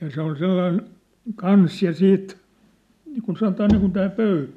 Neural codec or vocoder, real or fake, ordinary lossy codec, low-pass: none; real; none; 14.4 kHz